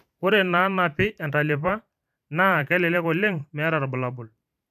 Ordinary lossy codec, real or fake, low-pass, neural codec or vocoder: none; fake; 14.4 kHz; vocoder, 44.1 kHz, 128 mel bands every 512 samples, BigVGAN v2